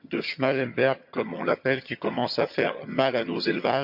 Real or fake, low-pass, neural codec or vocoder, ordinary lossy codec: fake; 5.4 kHz; vocoder, 22.05 kHz, 80 mel bands, HiFi-GAN; MP3, 48 kbps